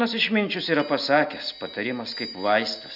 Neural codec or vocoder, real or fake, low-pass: none; real; 5.4 kHz